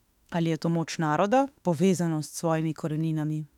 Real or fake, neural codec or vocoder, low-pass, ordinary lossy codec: fake; autoencoder, 48 kHz, 32 numbers a frame, DAC-VAE, trained on Japanese speech; 19.8 kHz; none